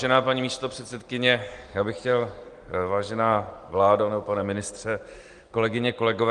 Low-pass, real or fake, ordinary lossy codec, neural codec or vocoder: 9.9 kHz; real; Opus, 24 kbps; none